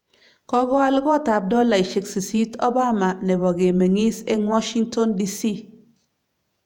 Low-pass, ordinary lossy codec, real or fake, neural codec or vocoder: 19.8 kHz; none; fake; vocoder, 48 kHz, 128 mel bands, Vocos